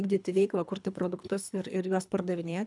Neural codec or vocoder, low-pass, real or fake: codec, 24 kHz, 3 kbps, HILCodec; 10.8 kHz; fake